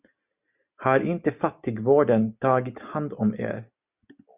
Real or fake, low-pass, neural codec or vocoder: real; 3.6 kHz; none